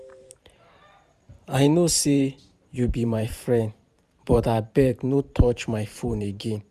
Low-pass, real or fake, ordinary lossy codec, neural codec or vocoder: 14.4 kHz; fake; none; vocoder, 44.1 kHz, 128 mel bands every 512 samples, BigVGAN v2